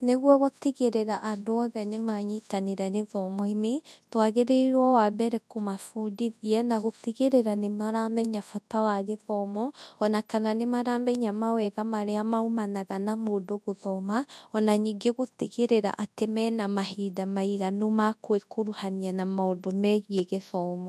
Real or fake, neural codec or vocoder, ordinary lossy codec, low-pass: fake; codec, 24 kHz, 0.9 kbps, WavTokenizer, large speech release; none; none